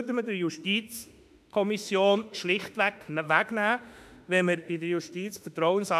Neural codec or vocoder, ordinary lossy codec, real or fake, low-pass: autoencoder, 48 kHz, 32 numbers a frame, DAC-VAE, trained on Japanese speech; none; fake; 14.4 kHz